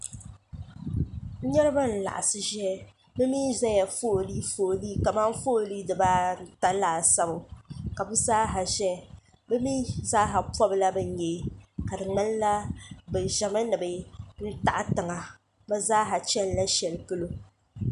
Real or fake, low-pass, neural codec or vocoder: real; 10.8 kHz; none